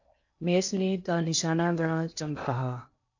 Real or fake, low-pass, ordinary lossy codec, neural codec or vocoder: fake; 7.2 kHz; AAC, 48 kbps; codec, 16 kHz in and 24 kHz out, 0.8 kbps, FocalCodec, streaming, 65536 codes